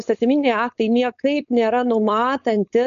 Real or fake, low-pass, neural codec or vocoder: fake; 7.2 kHz; codec, 16 kHz, 4.8 kbps, FACodec